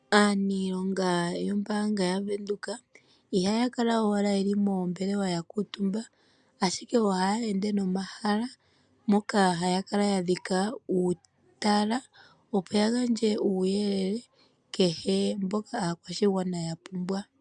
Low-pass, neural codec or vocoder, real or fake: 9.9 kHz; none; real